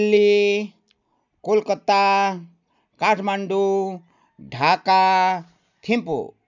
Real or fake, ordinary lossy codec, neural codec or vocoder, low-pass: real; none; none; 7.2 kHz